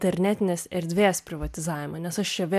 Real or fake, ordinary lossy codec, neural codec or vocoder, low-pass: real; MP3, 96 kbps; none; 14.4 kHz